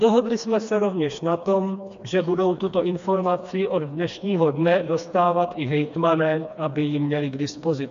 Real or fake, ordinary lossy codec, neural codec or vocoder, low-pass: fake; AAC, 64 kbps; codec, 16 kHz, 2 kbps, FreqCodec, smaller model; 7.2 kHz